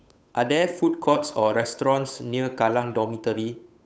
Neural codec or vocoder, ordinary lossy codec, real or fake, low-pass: codec, 16 kHz, 8 kbps, FunCodec, trained on Chinese and English, 25 frames a second; none; fake; none